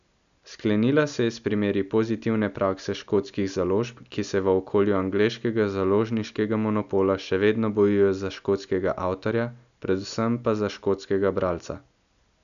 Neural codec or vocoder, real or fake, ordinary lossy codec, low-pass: none; real; none; 7.2 kHz